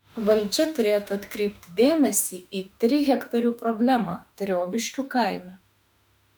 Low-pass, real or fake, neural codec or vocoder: 19.8 kHz; fake; autoencoder, 48 kHz, 32 numbers a frame, DAC-VAE, trained on Japanese speech